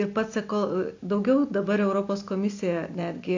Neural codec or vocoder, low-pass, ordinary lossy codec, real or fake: none; 7.2 kHz; AAC, 48 kbps; real